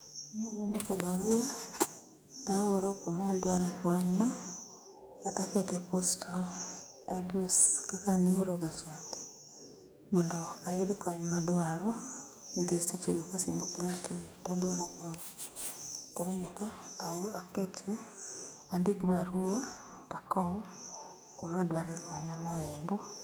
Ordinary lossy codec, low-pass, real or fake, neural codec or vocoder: none; none; fake; codec, 44.1 kHz, 2.6 kbps, DAC